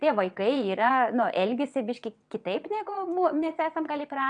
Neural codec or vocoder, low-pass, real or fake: vocoder, 22.05 kHz, 80 mel bands, WaveNeXt; 9.9 kHz; fake